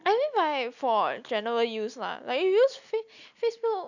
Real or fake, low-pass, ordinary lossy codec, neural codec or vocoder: real; 7.2 kHz; none; none